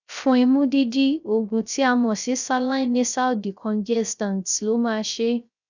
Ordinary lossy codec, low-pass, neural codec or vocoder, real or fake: none; 7.2 kHz; codec, 16 kHz, 0.3 kbps, FocalCodec; fake